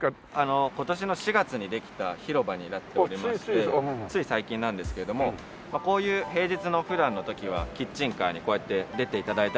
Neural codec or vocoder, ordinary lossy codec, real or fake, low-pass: none; none; real; none